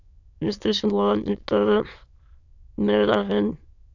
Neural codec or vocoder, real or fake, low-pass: autoencoder, 22.05 kHz, a latent of 192 numbers a frame, VITS, trained on many speakers; fake; 7.2 kHz